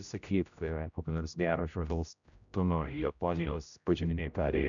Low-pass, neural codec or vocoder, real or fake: 7.2 kHz; codec, 16 kHz, 0.5 kbps, X-Codec, HuBERT features, trained on general audio; fake